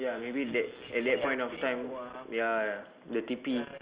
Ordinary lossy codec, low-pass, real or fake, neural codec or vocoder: Opus, 32 kbps; 3.6 kHz; real; none